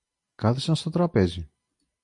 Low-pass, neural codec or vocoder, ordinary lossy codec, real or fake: 10.8 kHz; none; MP3, 64 kbps; real